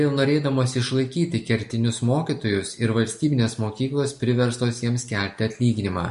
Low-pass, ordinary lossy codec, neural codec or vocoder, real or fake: 14.4 kHz; MP3, 48 kbps; none; real